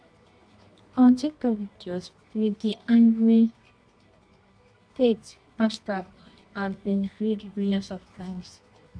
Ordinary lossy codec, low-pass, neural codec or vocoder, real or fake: none; 9.9 kHz; codec, 24 kHz, 0.9 kbps, WavTokenizer, medium music audio release; fake